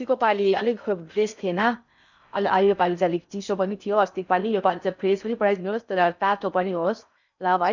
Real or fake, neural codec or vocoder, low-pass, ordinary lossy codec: fake; codec, 16 kHz in and 24 kHz out, 0.6 kbps, FocalCodec, streaming, 4096 codes; 7.2 kHz; none